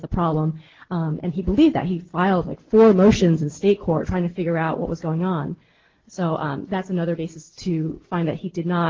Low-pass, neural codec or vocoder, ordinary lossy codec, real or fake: 7.2 kHz; none; Opus, 16 kbps; real